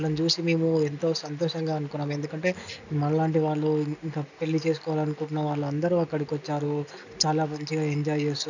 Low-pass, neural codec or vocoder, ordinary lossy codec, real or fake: 7.2 kHz; none; none; real